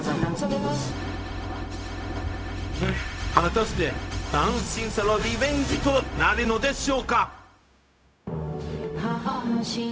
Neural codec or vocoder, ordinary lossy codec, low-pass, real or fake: codec, 16 kHz, 0.4 kbps, LongCat-Audio-Codec; none; none; fake